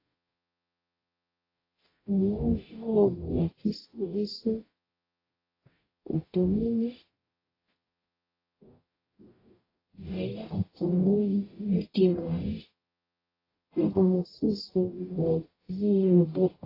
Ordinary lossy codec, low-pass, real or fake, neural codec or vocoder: AAC, 24 kbps; 5.4 kHz; fake; codec, 44.1 kHz, 0.9 kbps, DAC